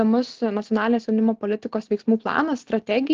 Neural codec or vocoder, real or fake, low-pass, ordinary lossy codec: none; real; 7.2 kHz; Opus, 16 kbps